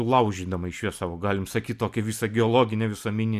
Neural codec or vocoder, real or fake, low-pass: none; real; 14.4 kHz